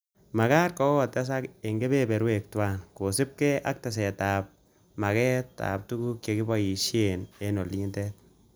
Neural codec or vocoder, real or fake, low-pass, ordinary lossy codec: none; real; none; none